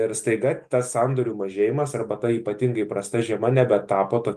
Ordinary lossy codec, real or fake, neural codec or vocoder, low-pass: Opus, 32 kbps; real; none; 14.4 kHz